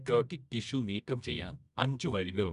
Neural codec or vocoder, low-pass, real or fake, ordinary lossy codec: codec, 24 kHz, 0.9 kbps, WavTokenizer, medium music audio release; 10.8 kHz; fake; none